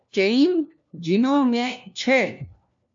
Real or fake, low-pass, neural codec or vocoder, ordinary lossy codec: fake; 7.2 kHz; codec, 16 kHz, 1 kbps, FunCodec, trained on LibriTTS, 50 frames a second; AAC, 64 kbps